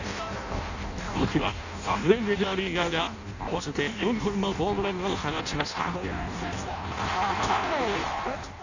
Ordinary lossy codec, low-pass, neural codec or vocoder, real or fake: none; 7.2 kHz; codec, 16 kHz in and 24 kHz out, 0.6 kbps, FireRedTTS-2 codec; fake